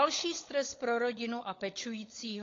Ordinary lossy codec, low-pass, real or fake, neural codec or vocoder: AAC, 32 kbps; 7.2 kHz; fake; codec, 16 kHz, 16 kbps, FunCodec, trained on LibriTTS, 50 frames a second